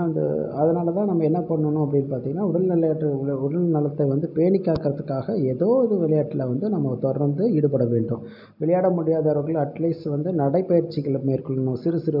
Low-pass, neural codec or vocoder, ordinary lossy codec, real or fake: 5.4 kHz; none; none; real